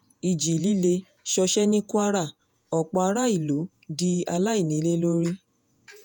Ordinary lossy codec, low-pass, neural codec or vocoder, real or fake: none; 19.8 kHz; vocoder, 48 kHz, 128 mel bands, Vocos; fake